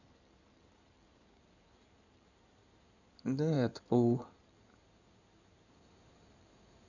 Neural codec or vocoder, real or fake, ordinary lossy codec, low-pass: codec, 16 kHz in and 24 kHz out, 2.2 kbps, FireRedTTS-2 codec; fake; none; 7.2 kHz